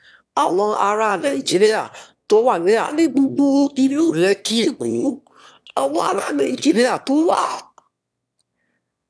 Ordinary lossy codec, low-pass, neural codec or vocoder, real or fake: none; none; autoencoder, 22.05 kHz, a latent of 192 numbers a frame, VITS, trained on one speaker; fake